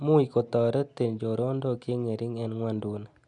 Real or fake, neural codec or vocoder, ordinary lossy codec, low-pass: real; none; none; 10.8 kHz